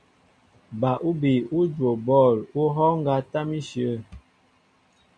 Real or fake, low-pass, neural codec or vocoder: real; 9.9 kHz; none